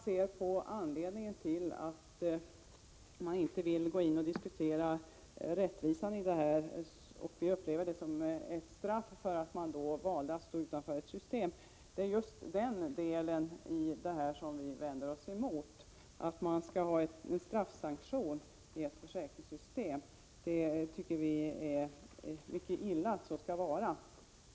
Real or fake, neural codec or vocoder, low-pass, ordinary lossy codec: real; none; none; none